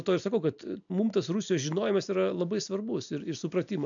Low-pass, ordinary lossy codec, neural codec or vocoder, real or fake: 7.2 kHz; AAC, 64 kbps; none; real